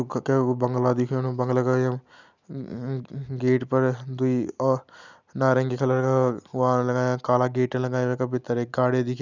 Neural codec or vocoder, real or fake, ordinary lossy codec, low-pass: none; real; none; 7.2 kHz